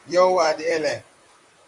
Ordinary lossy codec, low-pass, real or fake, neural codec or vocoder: MP3, 64 kbps; 10.8 kHz; fake; vocoder, 44.1 kHz, 128 mel bands, Pupu-Vocoder